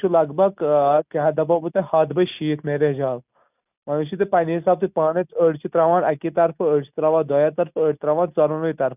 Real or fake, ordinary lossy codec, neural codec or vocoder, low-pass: real; none; none; 3.6 kHz